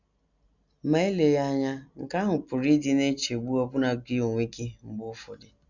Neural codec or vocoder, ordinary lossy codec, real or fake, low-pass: none; none; real; 7.2 kHz